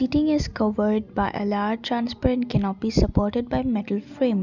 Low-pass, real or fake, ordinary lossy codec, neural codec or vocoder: 7.2 kHz; real; none; none